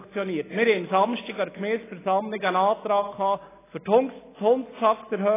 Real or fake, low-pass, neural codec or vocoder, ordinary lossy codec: real; 3.6 kHz; none; AAC, 16 kbps